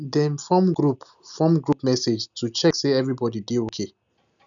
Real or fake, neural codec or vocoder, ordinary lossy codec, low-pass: real; none; none; 7.2 kHz